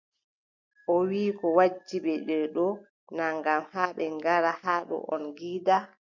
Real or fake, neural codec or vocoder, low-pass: real; none; 7.2 kHz